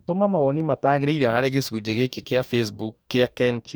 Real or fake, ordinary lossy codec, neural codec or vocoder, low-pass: fake; none; codec, 44.1 kHz, 2.6 kbps, DAC; none